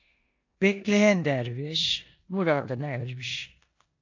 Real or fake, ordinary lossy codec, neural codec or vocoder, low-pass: fake; AAC, 48 kbps; codec, 16 kHz in and 24 kHz out, 0.9 kbps, LongCat-Audio-Codec, four codebook decoder; 7.2 kHz